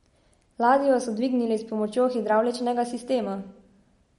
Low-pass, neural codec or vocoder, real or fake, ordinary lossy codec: 19.8 kHz; none; real; MP3, 48 kbps